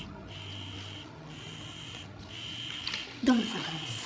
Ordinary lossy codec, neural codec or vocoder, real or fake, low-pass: none; codec, 16 kHz, 16 kbps, FreqCodec, larger model; fake; none